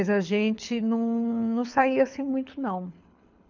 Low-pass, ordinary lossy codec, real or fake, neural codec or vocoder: 7.2 kHz; none; fake; codec, 24 kHz, 6 kbps, HILCodec